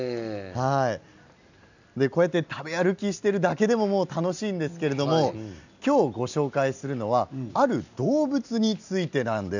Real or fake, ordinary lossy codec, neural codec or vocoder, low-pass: real; none; none; 7.2 kHz